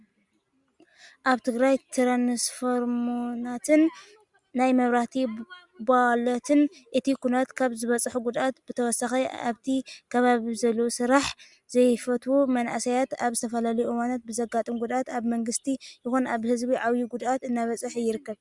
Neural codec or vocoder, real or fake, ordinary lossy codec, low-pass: none; real; Opus, 64 kbps; 10.8 kHz